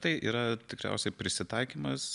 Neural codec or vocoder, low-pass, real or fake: none; 10.8 kHz; real